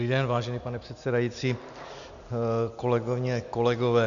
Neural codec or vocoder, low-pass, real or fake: none; 7.2 kHz; real